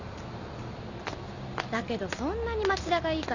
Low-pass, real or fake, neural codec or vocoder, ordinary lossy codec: 7.2 kHz; real; none; none